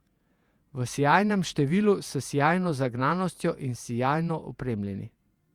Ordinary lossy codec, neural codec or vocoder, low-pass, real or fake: Opus, 64 kbps; vocoder, 48 kHz, 128 mel bands, Vocos; 19.8 kHz; fake